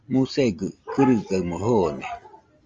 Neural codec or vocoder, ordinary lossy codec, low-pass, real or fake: none; Opus, 32 kbps; 7.2 kHz; real